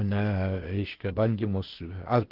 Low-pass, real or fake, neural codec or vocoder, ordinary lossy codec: 5.4 kHz; fake; codec, 16 kHz, 0.8 kbps, ZipCodec; Opus, 16 kbps